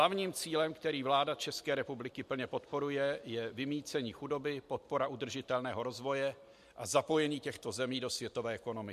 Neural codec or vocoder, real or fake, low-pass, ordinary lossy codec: none; real; 14.4 kHz; MP3, 64 kbps